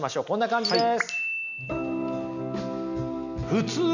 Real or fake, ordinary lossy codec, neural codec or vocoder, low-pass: real; none; none; 7.2 kHz